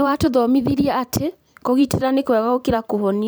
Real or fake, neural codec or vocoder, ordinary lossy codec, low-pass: fake; vocoder, 44.1 kHz, 128 mel bands every 512 samples, BigVGAN v2; none; none